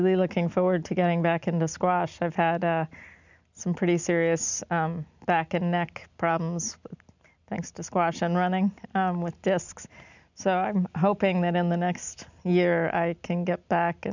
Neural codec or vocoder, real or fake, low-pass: none; real; 7.2 kHz